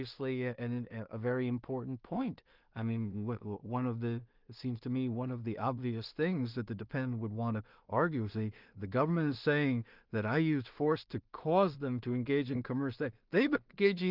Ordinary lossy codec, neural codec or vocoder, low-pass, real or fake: Opus, 24 kbps; codec, 16 kHz in and 24 kHz out, 0.4 kbps, LongCat-Audio-Codec, two codebook decoder; 5.4 kHz; fake